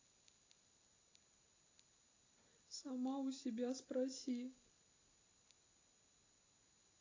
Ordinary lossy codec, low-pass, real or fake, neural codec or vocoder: AAC, 32 kbps; 7.2 kHz; real; none